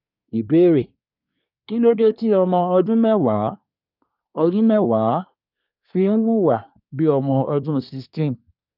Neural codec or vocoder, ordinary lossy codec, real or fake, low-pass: codec, 24 kHz, 1 kbps, SNAC; none; fake; 5.4 kHz